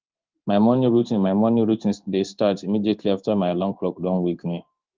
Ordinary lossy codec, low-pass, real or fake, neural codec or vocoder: Opus, 32 kbps; 7.2 kHz; fake; codec, 16 kHz in and 24 kHz out, 1 kbps, XY-Tokenizer